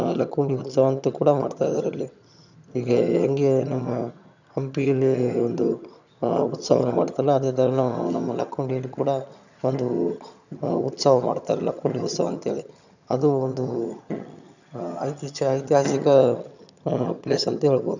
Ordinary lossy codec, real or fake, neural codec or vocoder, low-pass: none; fake; vocoder, 22.05 kHz, 80 mel bands, HiFi-GAN; 7.2 kHz